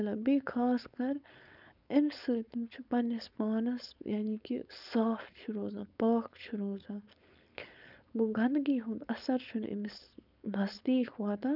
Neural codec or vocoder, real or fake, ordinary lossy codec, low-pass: codec, 16 kHz, 4.8 kbps, FACodec; fake; none; 5.4 kHz